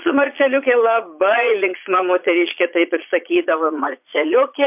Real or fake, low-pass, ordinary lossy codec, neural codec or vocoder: fake; 3.6 kHz; MP3, 32 kbps; vocoder, 22.05 kHz, 80 mel bands, Vocos